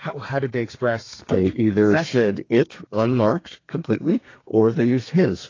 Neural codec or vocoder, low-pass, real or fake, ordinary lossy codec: codec, 32 kHz, 1.9 kbps, SNAC; 7.2 kHz; fake; AAC, 32 kbps